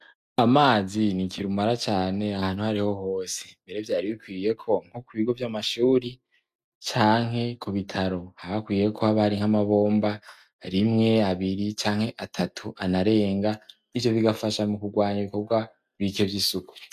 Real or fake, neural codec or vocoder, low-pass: real; none; 14.4 kHz